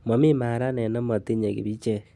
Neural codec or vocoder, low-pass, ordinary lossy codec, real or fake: none; none; none; real